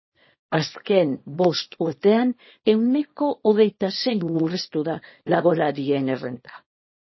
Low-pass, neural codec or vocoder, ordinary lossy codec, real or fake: 7.2 kHz; codec, 24 kHz, 0.9 kbps, WavTokenizer, small release; MP3, 24 kbps; fake